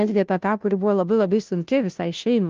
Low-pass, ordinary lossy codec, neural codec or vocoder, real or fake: 7.2 kHz; Opus, 32 kbps; codec, 16 kHz, 0.5 kbps, FunCodec, trained on Chinese and English, 25 frames a second; fake